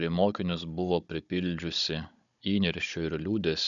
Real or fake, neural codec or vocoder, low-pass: fake; codec, 16 kHz, 16 kbps, FunCodec, trained on Chinese and English, 50 frames a second; 7.2 kHz